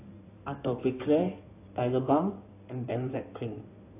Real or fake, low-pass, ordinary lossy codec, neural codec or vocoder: fake; 3.6 kHz; AAC, 24 kbps; codec, 16 kHz in and 24 kHz out, 2.2 kbps, FireRedTTS-2 codec